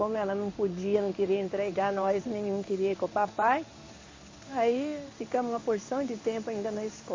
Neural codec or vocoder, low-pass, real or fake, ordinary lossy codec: codec, 16 kHz in and 24 kHz out, 1 kbps, XY-Tokenizer; 7.2 kHz; fake; MP3, 32 kbps